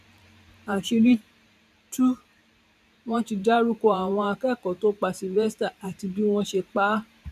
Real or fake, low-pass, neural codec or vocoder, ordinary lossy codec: fake; 14.4 kHz; vocoder, 44.1 kHz, 128 mel bands every 512 samples, BigVGAN v2; none